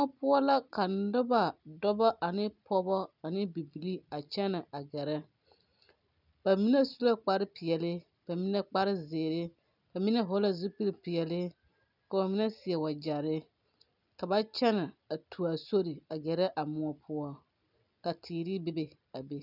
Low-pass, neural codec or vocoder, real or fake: 5.4 kHz; none; real